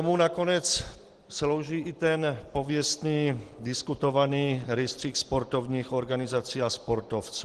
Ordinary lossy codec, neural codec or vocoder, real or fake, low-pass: Opus, 16 kbps; none; real; 10.8 kHz